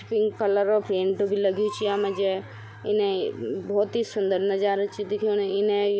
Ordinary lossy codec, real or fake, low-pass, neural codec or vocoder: none; real; none; none